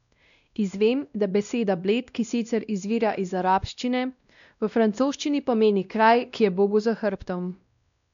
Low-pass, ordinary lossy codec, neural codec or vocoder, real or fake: 7.2 kHz; none; codec, 16 kHz, 1 kbps, X-Codec, WavLM features, trained on Multilingual LibriSpeech; fake